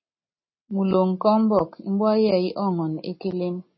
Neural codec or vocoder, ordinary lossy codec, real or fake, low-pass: none; MP3, 24 kbps; real; 7.2 kHz